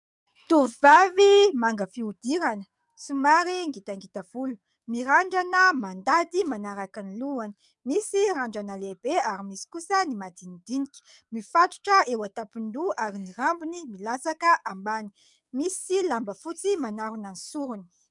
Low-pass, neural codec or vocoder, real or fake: 10.8 kHz; codec, 44.1 kHz, 7.8 kbps, DAC; fake